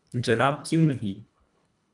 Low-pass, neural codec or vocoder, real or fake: 10.8 kHz; codec, 24 kHz, 1.5 kbps, HILCodec; fake